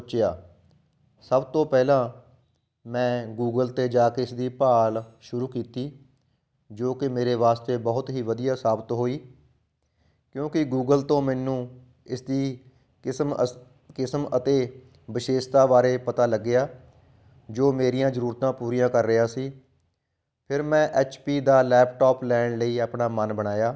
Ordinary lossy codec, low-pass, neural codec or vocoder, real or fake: none; none; none; real